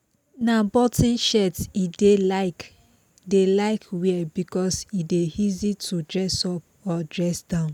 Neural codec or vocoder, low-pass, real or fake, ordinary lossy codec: none; 19.8 kHz; real; none